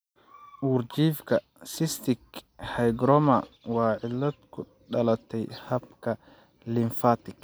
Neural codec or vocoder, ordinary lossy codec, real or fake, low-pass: none; none; real; none